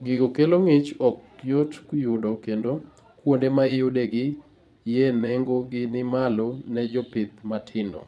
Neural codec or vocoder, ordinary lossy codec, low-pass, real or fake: vocoder, 22.05 kHz, 80 mel bands, Vocos; none; none; fake